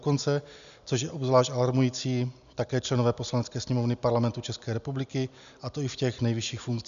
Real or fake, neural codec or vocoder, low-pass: real; none; 7.2 kHz